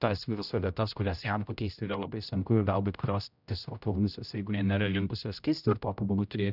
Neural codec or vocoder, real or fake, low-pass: codec, 16 kHz, 0.5 kbps, X-Codec, HuBERT features, trained on general audio; fake; 5.4 kHz